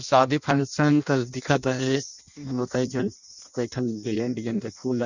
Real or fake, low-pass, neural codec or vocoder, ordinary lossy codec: fake; 7.2 kHz; codec, 16 kHz in and 24 kHz out, 0.6 kbps, FireRedTTS-2 codec; none